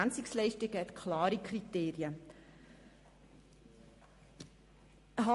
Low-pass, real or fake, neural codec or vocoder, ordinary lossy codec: 14.4 kHz; real; none; MP3, 48 kbps